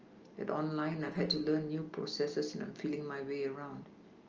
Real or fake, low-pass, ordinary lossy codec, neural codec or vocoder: real; 7.2 kHz; Opus, 24 kbps; none